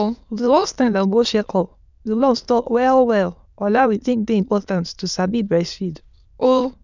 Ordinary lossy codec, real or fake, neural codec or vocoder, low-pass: none; fake; autoencoder, 22.05 kHz, a latent of 192 numbers a frame, VITS, trained on many speakers; 7.2 kHz